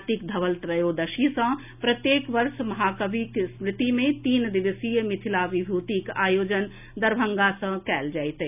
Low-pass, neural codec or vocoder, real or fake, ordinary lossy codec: 3.6 kHz; none; real; none